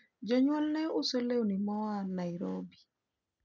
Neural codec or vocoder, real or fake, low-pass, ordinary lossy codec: none; real; 7.2 kHz; none